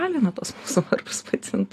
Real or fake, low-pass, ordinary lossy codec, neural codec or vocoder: real; 14.4 kHz; AAC, 48 kbps; none